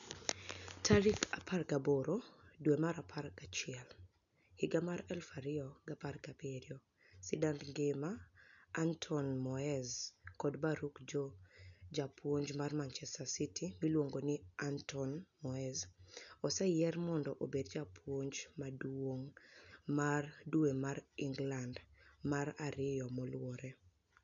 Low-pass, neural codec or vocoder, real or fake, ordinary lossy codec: 7.2 kHz; none; real; none